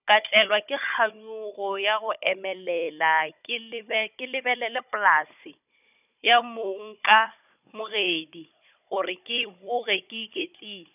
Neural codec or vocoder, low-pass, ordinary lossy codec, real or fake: codec, 16 kHz, 16 kbps, FunCodec, trained on Chinese and English, 50 frames a second; 3.6 kHz; none; fake